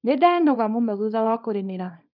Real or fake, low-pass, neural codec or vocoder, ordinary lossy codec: fake; 5.4 kHz; codec, 24 kHz, 0.9 kbps, WavTokenizer, small release; none